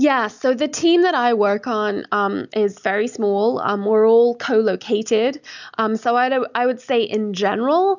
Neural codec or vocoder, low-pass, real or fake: none; 7.2 kHz; real